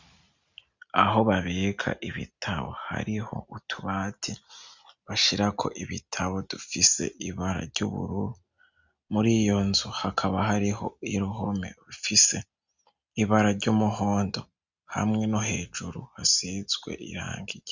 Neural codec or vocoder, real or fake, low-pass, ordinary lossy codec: none; real; 7.2 kHz; Opus, 64 kbps